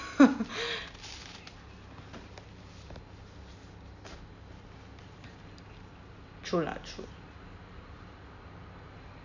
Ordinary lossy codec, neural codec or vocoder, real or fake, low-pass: none; none; real; 7.2 kHz